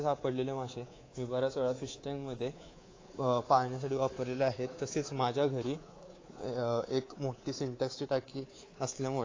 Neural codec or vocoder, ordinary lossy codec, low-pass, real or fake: codec, 24 kHz, 3.1 kbps, DualCodec; AAC, 32 kbps; 7.2 kHz; fake